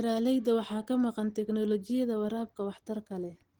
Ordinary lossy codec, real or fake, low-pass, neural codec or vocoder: Opus, 16 kbps; real; 19.8 kHz; none